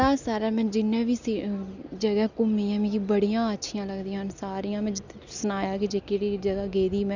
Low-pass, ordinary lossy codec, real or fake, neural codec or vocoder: 7.2 kHz; none; real; none